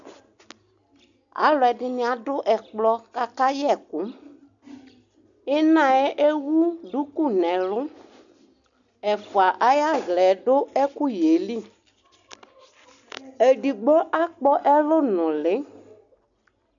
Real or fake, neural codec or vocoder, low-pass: real; none; 7.2 kHz